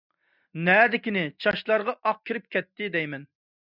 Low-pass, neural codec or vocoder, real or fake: 5.4 kHz; none; real